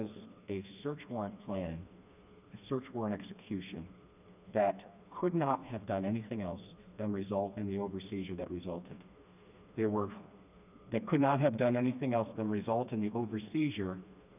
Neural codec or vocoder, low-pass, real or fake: codec, 16 kHz, 2 kbps, FreqCodec, smaller model; 3.6 kHz; fake